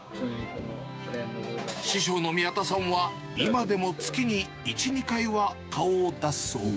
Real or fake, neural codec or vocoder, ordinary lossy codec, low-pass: fake; codec, 16 kHz, 6 kbps, DAC; none; none